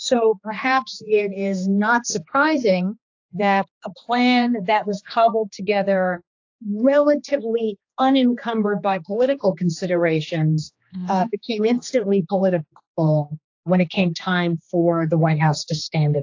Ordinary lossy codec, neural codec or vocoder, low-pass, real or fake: AAC, 48 kbps; codec, 16 kHz, 2 kbps, X-Codec, HuBERT features, trained on general audio; 7.2 kHz; fake